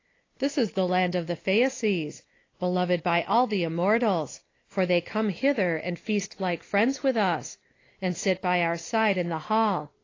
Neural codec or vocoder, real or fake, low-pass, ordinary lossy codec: none; real; 7.2 kHz; AAC, 32 kbps